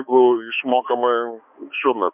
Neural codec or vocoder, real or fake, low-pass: codec, 16 kHz, 2 kbps, X-Codec, HuBERT features, trained on balanced general audio; fake; 3.6 kHz